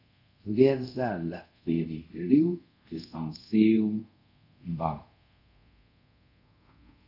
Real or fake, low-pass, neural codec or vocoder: fake; 5.4 kHz; codec, 24 kHz, 0.5 kbps, DualCodec